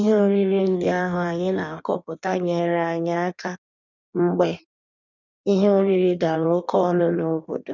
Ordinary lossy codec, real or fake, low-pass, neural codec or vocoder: none; fake; 7.2 kHz; codec, 16 kHz in and 24 kHz out, 1.1 kbps, FireRedTTS-2 codec